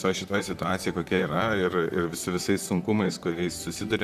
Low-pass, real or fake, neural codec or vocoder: 14.4 kHz; fake; vocoder, 44.1 kHz, 128 mel bands, Pupu-Vocoder